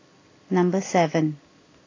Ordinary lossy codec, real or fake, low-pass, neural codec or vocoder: AAC, 32 kbps; real; 7.2 kHz; none